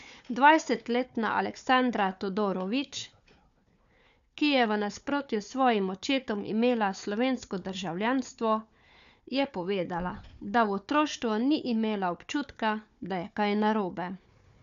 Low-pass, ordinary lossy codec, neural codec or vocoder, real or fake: 7.2 kHz; none; codec, 16 kHz, 4 kbps, FunCodec, trained on Chinese and English, 50 frames a second; fake